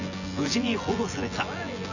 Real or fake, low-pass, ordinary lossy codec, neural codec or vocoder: fake; 7.2 kHz; none; vocoder, 24 kHz, 100 mel bands, Vocos